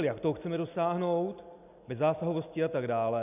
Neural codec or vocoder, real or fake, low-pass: none; real; 3.6 kHz